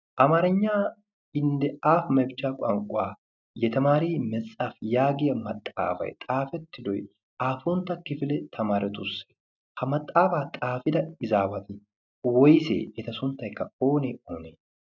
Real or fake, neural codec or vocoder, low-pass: real; none; 7.2 kHz